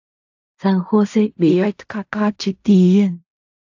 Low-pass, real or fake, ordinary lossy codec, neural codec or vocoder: 7.2 kHz; fake; AAC, 48 kbps; codec, 16 kHz in and 24 kHz out, 0.4 kbps, LongCat-Audio-Codec, fine tuned four codebook decoder